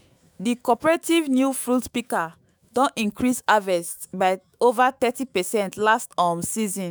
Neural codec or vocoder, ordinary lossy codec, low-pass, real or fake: autoencoder, 48 kHz, 128 numbers a frame, DAC-VAE, trained on Japanese speech; none; none; fake